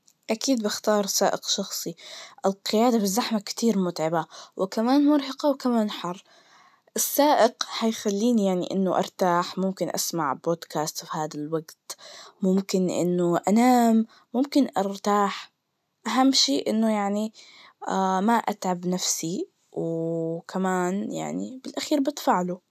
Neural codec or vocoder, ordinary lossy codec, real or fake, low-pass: none; none; real; 14.4 kHz